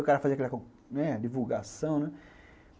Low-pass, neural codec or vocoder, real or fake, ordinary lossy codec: none; none; real; none